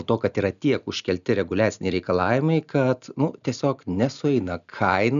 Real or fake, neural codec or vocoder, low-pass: real; none; 7.2 kHz